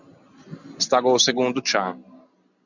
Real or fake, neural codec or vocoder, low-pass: real; none; 7.2 kHz